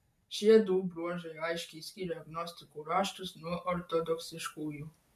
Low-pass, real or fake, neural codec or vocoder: 14.4 kHz; real; none